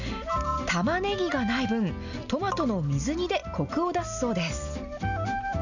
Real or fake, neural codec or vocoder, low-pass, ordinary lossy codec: real; none; 7.2 kHz; none